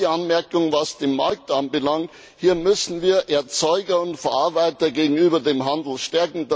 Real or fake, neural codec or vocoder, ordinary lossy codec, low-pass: real; none; none; none